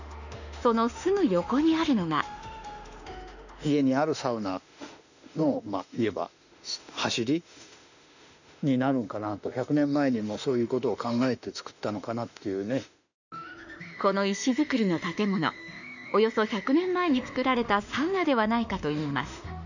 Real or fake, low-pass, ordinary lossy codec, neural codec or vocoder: fake; 7.2 kHz; none; autoencoder, 48 kHz, 32 numbers a frame, DAC-VAE, trained on Japanese speech